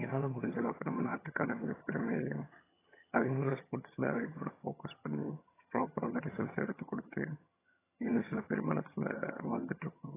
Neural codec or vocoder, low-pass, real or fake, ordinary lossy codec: vocoder, 22.05 kHz, 80 mel bands, HiFi-GAN; 3.6 kHz; fake; AAC, 16 kbps